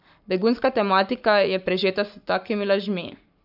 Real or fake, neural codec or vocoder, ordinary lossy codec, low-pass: fake; codec, 44.1 kHz, 7.8 kbps, Pupu-Codec; none; 5.4 kHz